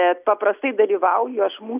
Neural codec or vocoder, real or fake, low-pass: none; real; 3.6 kHz